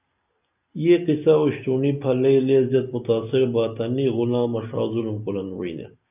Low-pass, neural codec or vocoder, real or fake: 3.6 kHz; none; real